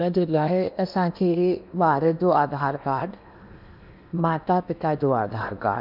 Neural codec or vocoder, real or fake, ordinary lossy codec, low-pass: codec, 16 kHz in and 24 kHz out, 0.8 kbps, FocalCodec, streaming, 65536 codes; fake; none; 5.4 kHz